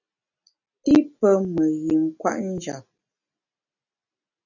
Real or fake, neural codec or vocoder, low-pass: real; none; 7.2 kHz